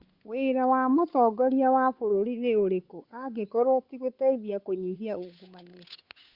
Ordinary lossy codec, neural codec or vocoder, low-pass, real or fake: Opus, 64 kbps; codec, 16 kHz, 8 kbps, FunCodec, trained on Chinese and English, 25 frames a second; 5.4 kHz; fake